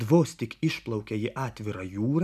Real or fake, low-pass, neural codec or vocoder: real; 14.4 kHz; none